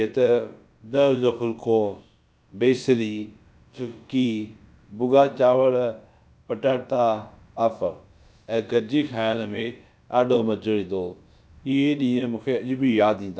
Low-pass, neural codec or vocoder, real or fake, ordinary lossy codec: none; codec, 16 kHz, about 1 kbps, DyCAST, with the encoder's durations; fake; none